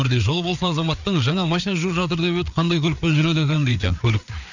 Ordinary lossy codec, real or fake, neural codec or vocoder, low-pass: none; fake; codec, 16 kHz, 4 kbps, FreqCodec, larger model; 7.2 kHz